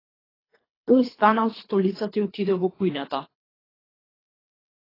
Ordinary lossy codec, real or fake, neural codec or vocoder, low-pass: AAC, 24 kbps; fake; codec, 24 kHz, 3 kbps, HILCodec; 5.4 kHz